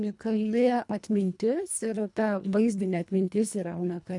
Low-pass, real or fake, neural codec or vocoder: 10.8 kHz; fake; codec, 24 kHz, 1.5 kbps, HILCodec